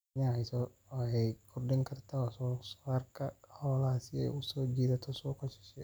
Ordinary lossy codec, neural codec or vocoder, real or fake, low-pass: none; none; real; none